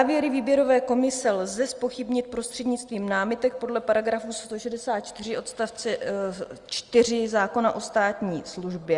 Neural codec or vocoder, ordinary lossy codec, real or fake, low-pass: none; Opus, 32 kbps; real; 10.8 kHz